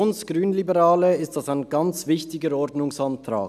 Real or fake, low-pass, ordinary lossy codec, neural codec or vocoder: real; 14.4 kHz; none; none